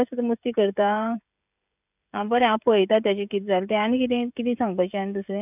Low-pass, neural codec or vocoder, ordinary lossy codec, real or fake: 3.6 kHz; codec, 16 kHz, 16 kbps, FreqCodec, smaller model; none; fake